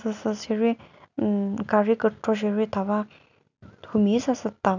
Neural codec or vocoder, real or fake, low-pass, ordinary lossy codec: none; real; 7.2 kHz; none